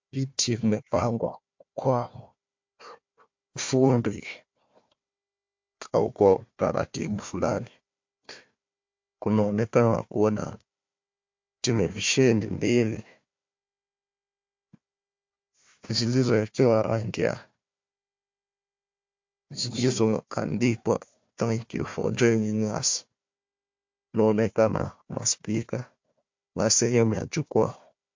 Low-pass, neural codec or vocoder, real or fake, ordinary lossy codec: 7.2 kHz; codec, 16 kHz, 1 kbps, FunCodec, trained on Chinese and English, 50 frames a second; fake; MP3, 48 kbps